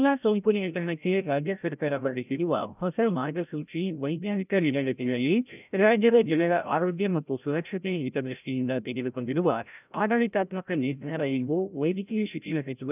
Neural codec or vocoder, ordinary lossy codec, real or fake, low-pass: codec, 16 kHz, 0.5 kbps, FreqCodec, larger model; none; fake; 3.6 kHz